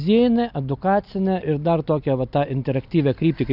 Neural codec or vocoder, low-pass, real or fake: none; 5.4 kHz; real